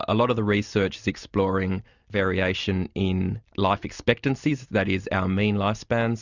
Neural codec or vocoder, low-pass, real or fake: none; 7.2 kHz; real